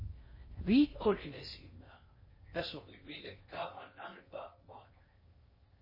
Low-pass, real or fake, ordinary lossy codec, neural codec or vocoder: 5.4 kHz; fake; MP3, 24 kbps; codec, 16 kHz in and 24 kHz out, 0.6 kbps, FocalCodec, streaming, 4096 codes